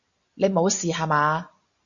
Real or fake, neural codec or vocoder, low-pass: real; none; 7.2 kHz